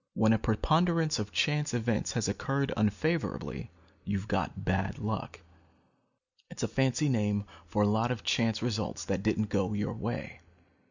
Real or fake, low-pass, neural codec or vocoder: real; 7.2 kHz; none